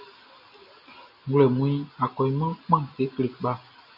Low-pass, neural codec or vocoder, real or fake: 5.4 kHz; none; real